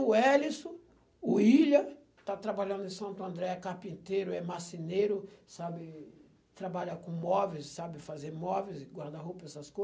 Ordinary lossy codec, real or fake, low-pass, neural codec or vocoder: none; real; none; none